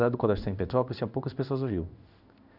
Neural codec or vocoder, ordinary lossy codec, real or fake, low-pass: codec, 16 kHz in and 24 kHz out, 1 kbps, XY-Tokenizer; none; fake; 5.4 kHz